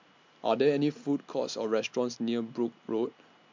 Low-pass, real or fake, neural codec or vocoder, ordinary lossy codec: 7.2 kHz; real; none; MP3, 64 kbps